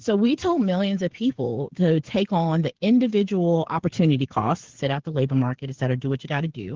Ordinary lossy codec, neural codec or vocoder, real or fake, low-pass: Opus, 16 kbps; codec, 16 kHz, 8 kbps, FreqCodec, smaller model; fake; 7.2 kHz